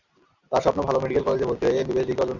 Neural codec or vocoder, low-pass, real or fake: none; 7.2 kHz; real